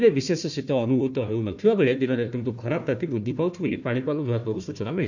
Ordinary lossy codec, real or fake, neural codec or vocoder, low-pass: none; fake; codec, 16 kHz, 1 kbps, FunCodec, trained on Chinese and English, 50 frames a second; 7.2 kHz